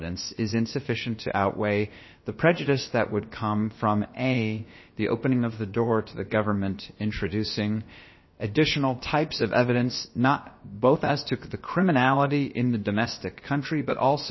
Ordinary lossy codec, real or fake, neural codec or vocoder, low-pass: MP3, 24 kbps; fake; codec, 16 kHz, about 1 kbps, DyCAST, with the encoder's durations; 7.2 kHz